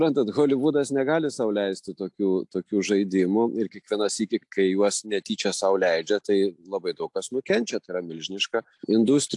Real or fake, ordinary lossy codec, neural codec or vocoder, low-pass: real; AAC, 64 kbps; none; 10.8 kHz